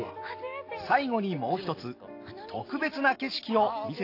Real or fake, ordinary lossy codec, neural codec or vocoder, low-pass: real; AAC, 32 kbps; none; 5.4 kHz